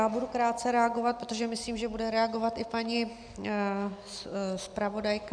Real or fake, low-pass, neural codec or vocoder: real; 9.9 kHz; none